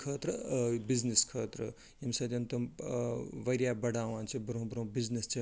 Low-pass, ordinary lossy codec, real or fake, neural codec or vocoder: none; none; real; none